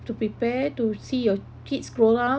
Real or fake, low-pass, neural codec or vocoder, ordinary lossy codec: real; none; none; none